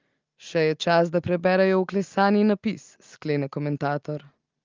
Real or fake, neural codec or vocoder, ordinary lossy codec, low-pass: real; none; Opus, 32 kbps; 7.2 kHz